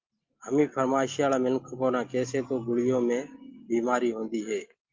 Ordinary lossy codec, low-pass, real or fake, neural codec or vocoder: Opus, 32 kbps; 7.2 kHz; real; none